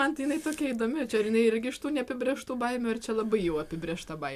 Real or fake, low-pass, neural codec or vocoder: real; 14.4 kHz; none